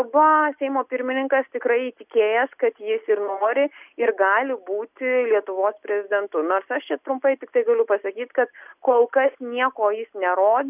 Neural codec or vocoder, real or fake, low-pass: none; real; 3.6 kHz